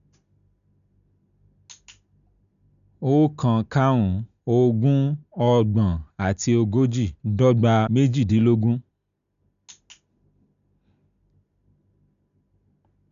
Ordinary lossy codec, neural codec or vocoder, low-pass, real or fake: none; none; 7.2 kHz; real